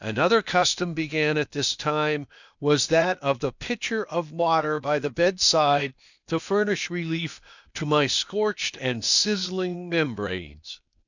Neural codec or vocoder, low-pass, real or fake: codec, 16 kHz, 0.8 kbps, ZipCodec; 7.2 kHz; fake